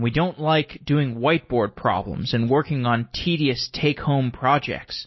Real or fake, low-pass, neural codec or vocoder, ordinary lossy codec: real; 7.2 kHz; none; MP3, 24 kbps